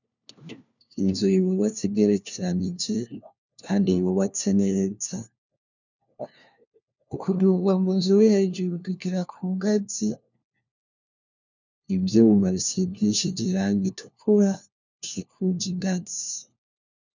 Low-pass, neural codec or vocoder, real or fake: 7.2 kHz; codec, 16 kHz, 1 kbps, FunCodec, trained on LibriTTS, 50 frames a second; fake